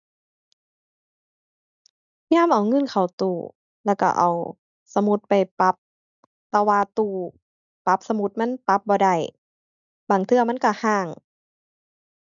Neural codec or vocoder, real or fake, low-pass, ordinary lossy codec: none; real; 7.2 kHz; none